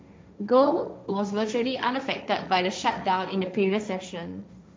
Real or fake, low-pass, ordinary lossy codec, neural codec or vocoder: fake; none; none; codec, 16 kHz, 1.1 kbps, Voila-Tokenizer